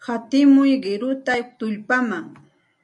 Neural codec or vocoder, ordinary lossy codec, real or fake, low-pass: none; AAC, 64 kbps; real; 10.8 kHz